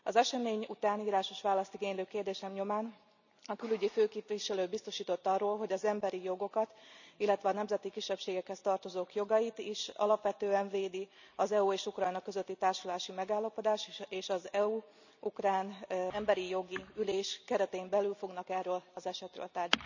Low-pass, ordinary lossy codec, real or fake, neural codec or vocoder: 7.2 kHz; none; real; none